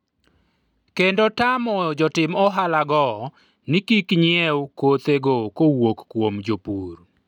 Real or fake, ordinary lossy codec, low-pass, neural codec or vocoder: real; none; 19.8 kHz; none